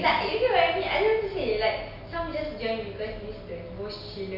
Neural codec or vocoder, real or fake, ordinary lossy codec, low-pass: none; real; MP3, 48 kbps; 5.4 kHz